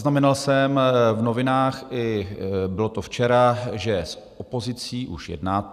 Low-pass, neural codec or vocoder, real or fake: 14.4 kHz; none; real